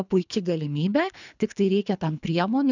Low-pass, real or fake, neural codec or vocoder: 7.2 kHz; fake; codec, 24 kHz, 3 kbps, HILCodec